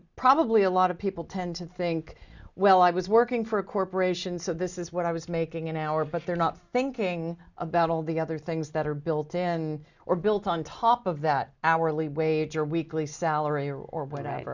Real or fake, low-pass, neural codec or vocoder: real; 7.2 kHz; none